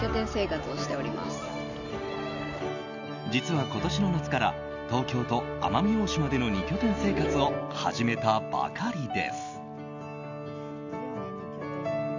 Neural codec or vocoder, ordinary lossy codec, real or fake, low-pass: none; none; real; 7.2 kHz